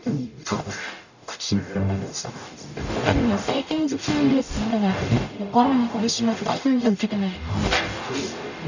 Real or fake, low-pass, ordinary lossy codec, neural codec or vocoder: fake; 7.2 kHz; none; codec, 44.1 kHz, 0.9 kbps, DAC